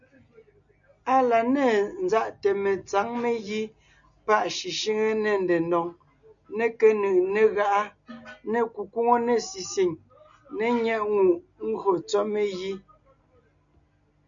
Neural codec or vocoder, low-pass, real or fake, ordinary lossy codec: none; 7.2 kHz; real; MP3, 96 kbps